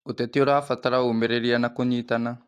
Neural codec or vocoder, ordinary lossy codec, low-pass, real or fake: none; AAC, 64 kbps; 14.4 kHz; real